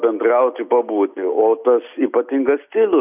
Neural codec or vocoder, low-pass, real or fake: none; 3.6 kHz; real